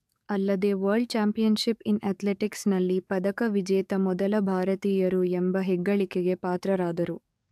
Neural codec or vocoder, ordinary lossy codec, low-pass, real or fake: codec, 44.1 kHz, 7.8 kbps, DAC; AAC, 96 kbps; 14.4 kHz; fake